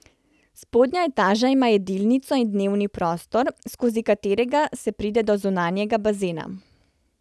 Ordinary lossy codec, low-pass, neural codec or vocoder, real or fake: none; none; none; real